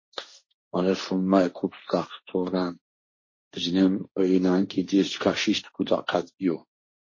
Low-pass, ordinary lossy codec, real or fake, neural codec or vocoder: 7.2 kHz; MP3, 32 kbps; fake; codec, 16 kHz, 1.1 kbps, Voila-Tokenizer